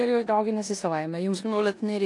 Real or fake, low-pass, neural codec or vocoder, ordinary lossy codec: fake; 10.8 kHz; codec, 16 kHz in and 24 kHz out, 0.9 kbps, LongCat-Audio-Codec, four codebook decoder; AAC, 48 kbps